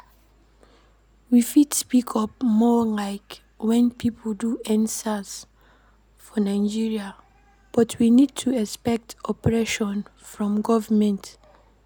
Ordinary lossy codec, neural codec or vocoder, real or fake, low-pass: none; none; real; none